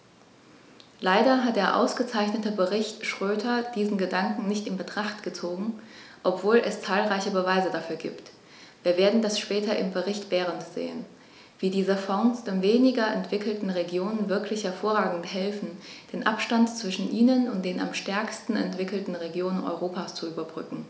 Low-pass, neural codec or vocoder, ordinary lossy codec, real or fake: none; none; none; real